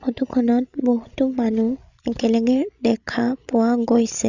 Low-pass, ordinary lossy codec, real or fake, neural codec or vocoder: 7.2 kHz; Opus, 64 kbps; fake; codec, 16 kHz, 16 kbps, FreqCodec, larger model